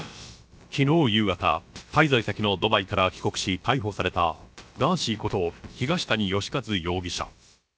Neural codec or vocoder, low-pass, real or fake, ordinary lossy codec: codec, 16 kHz, about 1 kbps, DyCAST, with the encoder's durations; none; fake; none